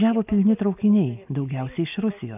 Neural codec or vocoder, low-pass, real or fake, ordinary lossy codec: none; 3.6 kHz; real; AAC, 32 kbps